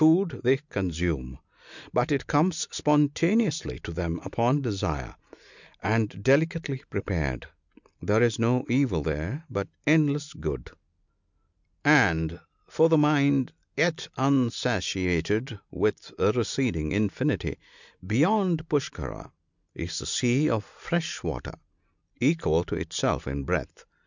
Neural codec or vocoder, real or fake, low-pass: none; real; 7.2 kHz